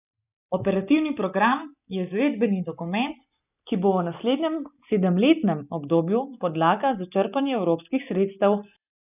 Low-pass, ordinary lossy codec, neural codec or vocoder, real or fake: 3.6 kHz; none; none; real